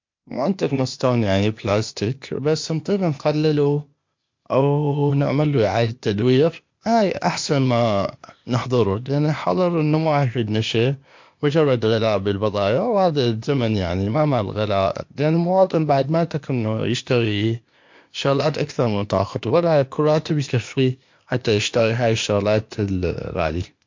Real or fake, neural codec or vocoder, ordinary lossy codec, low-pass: fake; codec, 16 kHz, 0.8 kbps, ZipCodec; MP3, 48 kbps; 7.2 kHz